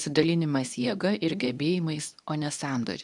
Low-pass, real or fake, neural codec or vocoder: 10.8 kHz; fake; codec, 24 kHz, 0.9 kbps, WavTokenizer, medium speech release version 2